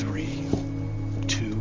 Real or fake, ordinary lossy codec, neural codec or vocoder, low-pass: real; Opus, 32 kbps; none; 7.2 kHz